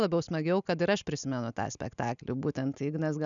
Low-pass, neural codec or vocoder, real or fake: 7.2 kHz; none; real